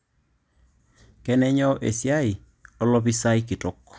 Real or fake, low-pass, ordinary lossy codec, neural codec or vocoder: real; none; none; none